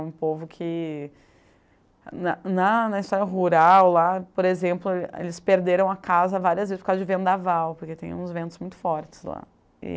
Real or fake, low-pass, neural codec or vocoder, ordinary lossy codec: real; none; none; none